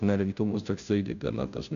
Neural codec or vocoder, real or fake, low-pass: codec, 16 kHz, 0.5 kbps, FunCodec, trained on Chinese and English, 25 frames a second; fake; 7.2 kHz